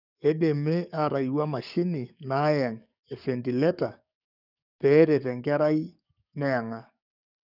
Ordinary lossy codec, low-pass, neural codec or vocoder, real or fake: none; 5.4 kHz; codec, 44.1 kHz, 7.8 kbps, DAC; fake